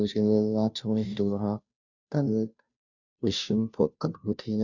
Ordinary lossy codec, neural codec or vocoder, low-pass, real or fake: none; codec, 16 kHz, 0.5 kbps, FunCodec, trained on Chinese and English, 25 frames a second; 7.2 kHz; fake